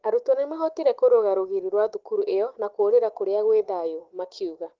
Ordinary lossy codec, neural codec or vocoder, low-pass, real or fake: Opus, 16 kbps; none; 7.2 kHz; real